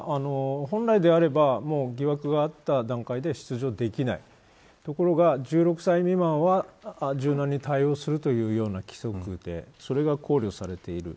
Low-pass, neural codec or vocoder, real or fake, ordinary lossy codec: none; none; real; none